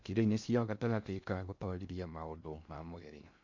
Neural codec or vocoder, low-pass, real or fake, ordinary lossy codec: codec, 16 kHz in and 24 kHz out, 0.6 kbps, FocalCodec, streaming, 2048 codes; 7.2 kHz; fake; MP3, 64 kbps